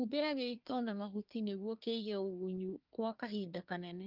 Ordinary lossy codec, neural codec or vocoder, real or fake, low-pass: Opus, 24 kbps; codec, 24 kHz, 1 kbps, SNAC; fake; 5.4 kHz